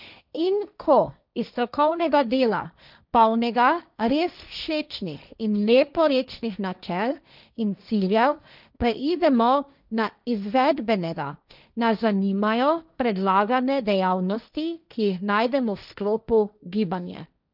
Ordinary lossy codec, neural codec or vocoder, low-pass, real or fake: none; codec, 16 kHz, 1.1 kbps, Voila-Tokenizer; 5.4 kHz; fake